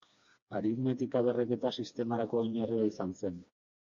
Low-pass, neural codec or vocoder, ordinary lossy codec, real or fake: 7.2 kHz; codec, 16 kHz, 2 kbps, FreqCodec, smaller model; AAC, 64 kbps; fake